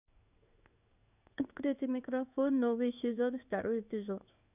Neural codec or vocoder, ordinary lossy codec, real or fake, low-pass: codec, 16 kHz in and 24 kHz out, 1 kbps, XY-Tokenizer; none; fake; 3.6 kHz